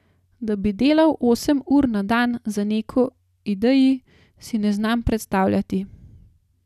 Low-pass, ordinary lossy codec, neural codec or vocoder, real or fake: 14.4 kHz; none; none; real